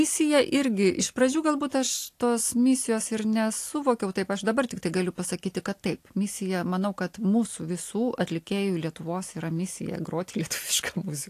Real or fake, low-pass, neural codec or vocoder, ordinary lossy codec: real; 14.4 kHz; none; AAC, 64 kbps